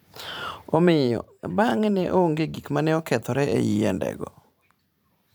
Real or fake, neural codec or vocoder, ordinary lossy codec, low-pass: fake; vocoder, 44.1 kHz, 128 mel bands every 512 samples, BigVGAN v2; none; none